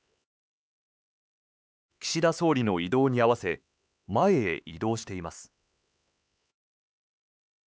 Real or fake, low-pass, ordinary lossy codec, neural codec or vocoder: fake; none; none; codec, 16 kHz, 4 kbps, X-Codec, HuBERT features, trained on LibriSpeech